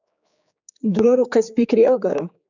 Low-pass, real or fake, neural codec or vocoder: 7.2 kHz; fake; codec, 16 kHz, 4 kbps, X-Codec, HuBERT features, trained on general audio